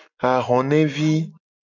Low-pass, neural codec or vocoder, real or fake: 7.2 kHz; none; real